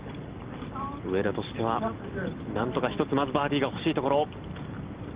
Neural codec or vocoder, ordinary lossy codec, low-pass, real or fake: none; Opus, 16 kbps; 3.6 kHz; real